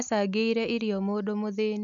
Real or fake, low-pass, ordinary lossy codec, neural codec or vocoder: real; 7.2 kHz; none; none